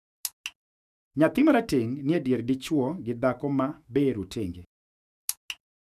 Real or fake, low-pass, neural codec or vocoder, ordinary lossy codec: fake; 14.4 kHz; autoencoder, 48 kHz, 128 numbers a frame, DAC-VAE, trained on Japanese speech; none